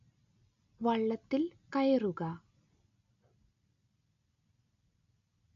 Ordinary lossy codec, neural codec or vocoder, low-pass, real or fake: none; none; 7.2 kHz; real